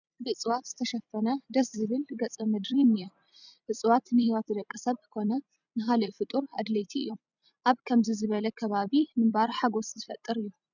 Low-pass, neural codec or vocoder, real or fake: 7.2 kHz; vocoder, 44.1 kHz, 128 mel bands every 256 samples, BigVGAN v2; fake